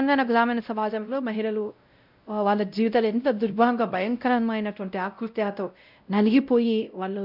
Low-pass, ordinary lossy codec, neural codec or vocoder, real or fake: 5.4 kHz; none; codec, 16 kHz, 0.5 kbps, X-Codec, WavLM features, trained on Multilingual LibriSpeech; fake